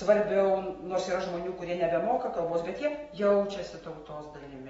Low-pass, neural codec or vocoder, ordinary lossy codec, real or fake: 19.8 kHz; none; AAC, 24 kbps; real